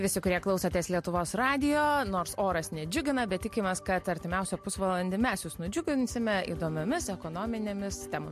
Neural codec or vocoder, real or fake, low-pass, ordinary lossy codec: none; real; 14.4 kHz; MP3, 64 kbps